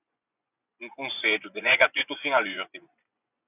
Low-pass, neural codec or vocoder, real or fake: 3.6 kHz; vocoder, 24 kHz, 100 mel bands, Vocos; fake